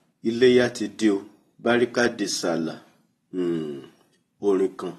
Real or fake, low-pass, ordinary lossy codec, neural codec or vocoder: real; 19.8 kHz; AAC, 32 kbps; none